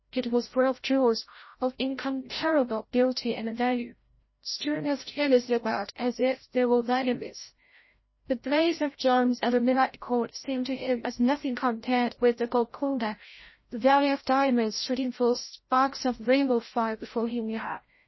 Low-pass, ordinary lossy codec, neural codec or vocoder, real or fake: 7.2 kHz; MP3, 24 kbps; codec, 16 kHz, 0.5 kbps, FreqCodec, larger model; fake